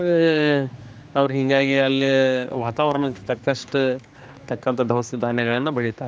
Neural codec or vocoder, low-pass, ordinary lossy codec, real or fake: codec, 16 kHz, 2 kbps, X-Codec, HuBERT features, trained on general audio; none; none; fake